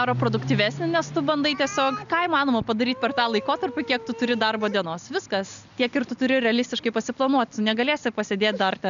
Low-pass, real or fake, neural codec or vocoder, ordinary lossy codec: 7.2 kHz; real; none; MP3, 96 kbps